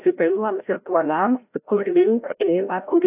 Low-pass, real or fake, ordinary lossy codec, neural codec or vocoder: 3.6 kHz; fake; AAC, 32 kbps; codec, 16 kHz, 0.5 kbps, FreqCodec, larger model